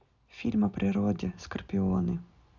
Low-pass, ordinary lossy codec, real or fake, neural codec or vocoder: 7.2 kHz; none; real; none